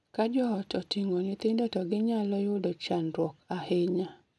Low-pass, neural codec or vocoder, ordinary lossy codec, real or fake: none; none; none; real